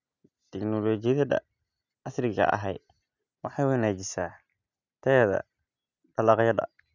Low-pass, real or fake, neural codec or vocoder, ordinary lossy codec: 7.2 kHz; real; none; none